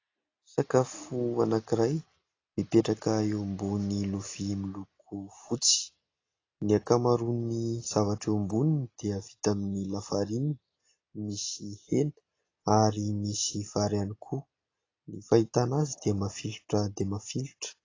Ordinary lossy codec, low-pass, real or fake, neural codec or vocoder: AAC, 32 kbps; 7.2 kHz; real; none